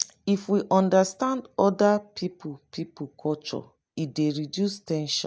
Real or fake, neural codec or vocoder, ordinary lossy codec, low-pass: real; none; none; none